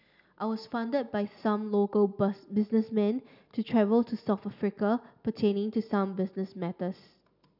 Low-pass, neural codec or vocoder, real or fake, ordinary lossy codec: 5.4 kHz; none; real; none